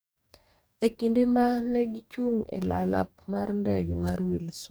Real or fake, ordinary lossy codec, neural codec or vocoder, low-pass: fake; none; codec, 44.1 kHz, 2.6 kbps, DAC; none